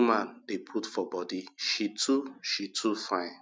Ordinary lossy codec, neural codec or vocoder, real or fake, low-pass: none; none; real; none